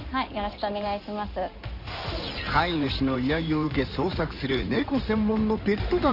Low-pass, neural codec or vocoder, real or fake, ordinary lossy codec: 5.4 kHz; codec, 16 kHz in and 24 kHz out, 2.2 kbps, FireRedTTS-2 codec; fake; none